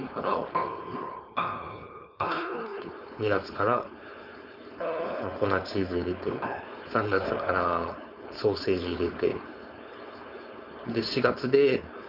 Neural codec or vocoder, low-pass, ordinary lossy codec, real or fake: codec, 16 kHz, 4.8 kbps, FACodec; 5.4 kHz; none; fake